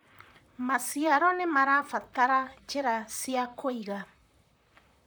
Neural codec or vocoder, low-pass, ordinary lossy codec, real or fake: vocoder, 44.1 kHz, 128 mel bands, Pupu-Vocoder; none; none; fake